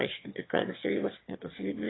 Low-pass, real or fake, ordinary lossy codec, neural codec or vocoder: 7.2 kHz; fake; AAC, 16 kbps; autoencoder, 22.05 kHz, a latent of 192 numbers a frame, VITS, trained on one speaker